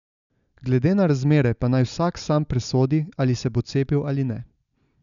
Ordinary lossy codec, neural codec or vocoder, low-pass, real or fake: none; none; 7.2 kHz; real